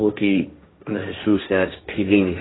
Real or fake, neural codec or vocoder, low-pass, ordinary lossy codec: fake; codec, 16 kHz, 1.1 kbps, Voila-Tokenizer; 7.2 kHz; AAC, 16 kbps